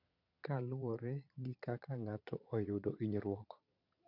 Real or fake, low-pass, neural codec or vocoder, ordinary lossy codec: fake; 5.4 kHz; vocoder, 22.05 kHz, 80 mel bands, Vocos; none